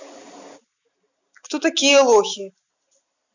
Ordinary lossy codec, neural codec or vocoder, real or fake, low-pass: none; none; real; 7.2 kHz